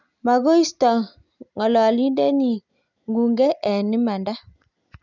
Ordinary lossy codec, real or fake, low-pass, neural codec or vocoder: none; real; 7.2 kHz; none